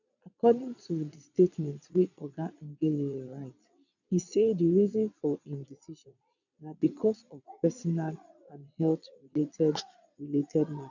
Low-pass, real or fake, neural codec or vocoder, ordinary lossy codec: 7.2 kHz; fake; vocoder, 22.05 kHz, 80 mel bands, Vocos; none